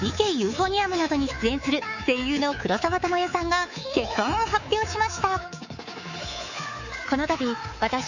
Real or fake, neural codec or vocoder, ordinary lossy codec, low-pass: fake; codec, 24 kHz, 3.1 kbps, DualCodec; none; 7.2 kHz